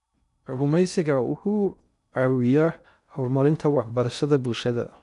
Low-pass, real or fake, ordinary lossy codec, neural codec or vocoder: 10.8 kHz; fake; none; codec, 16 kHz in and 24 kHz out, 0.6 kbps, FocalCodec, streaming, 2048 codes